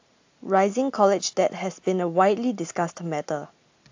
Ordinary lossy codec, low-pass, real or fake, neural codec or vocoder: AAC, 48 kbps; 7.2 kHz; real; none